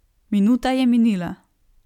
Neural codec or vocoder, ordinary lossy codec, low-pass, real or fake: none; none; 19.8 kHz; real